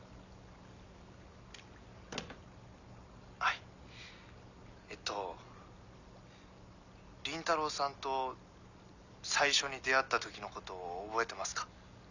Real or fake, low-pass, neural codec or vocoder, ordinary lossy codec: real; 7.2 kHz; none; none